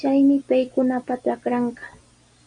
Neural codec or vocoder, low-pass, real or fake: none; 9.9 kHz; real